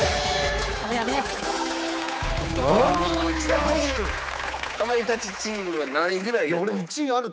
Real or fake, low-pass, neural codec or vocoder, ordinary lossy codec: fake; none; codec, 16 kHz, 4 kbps, X-Codec, HuBERT features, trained on general audio; none